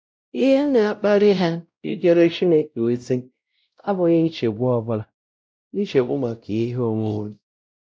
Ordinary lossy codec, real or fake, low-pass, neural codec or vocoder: none; fake; none; codec, 16 kHz, 0.5 kbps, X-Codec, WavLM features, trained on Multilingual LibriSpeech